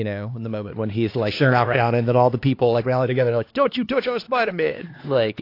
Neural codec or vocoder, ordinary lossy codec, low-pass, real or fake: codec, 16 kHz, 2 kbps, X-Codec, HuBERT features, trained on LibriSpeech; AAC, 32 kbps; 5.4 kHz; fake